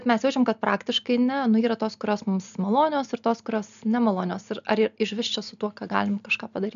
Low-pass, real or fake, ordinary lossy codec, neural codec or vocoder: 7.2 kHz; real; AAC, 96 kbps; none